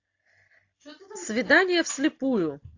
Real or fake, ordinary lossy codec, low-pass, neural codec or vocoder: real; AAC, 48 kbps; 7.2 kHz; none